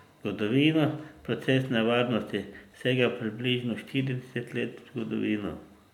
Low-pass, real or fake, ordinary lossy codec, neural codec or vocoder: 19.8 kHz; real; none; none